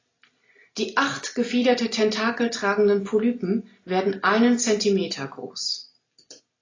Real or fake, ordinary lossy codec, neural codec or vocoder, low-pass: real; AAC, 32 kbps; none; 7.2 kHz